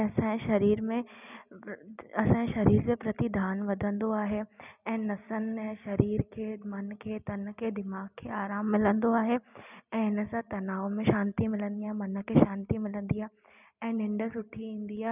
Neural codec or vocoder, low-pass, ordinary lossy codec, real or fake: none; 3.6 kHz; none; real